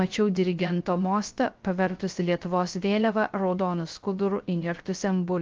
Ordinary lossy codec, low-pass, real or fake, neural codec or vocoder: Opus, 24 kbps; 7.2 kHz; fake; codec, 16 kHz, 0.3 kbps, FocalCodec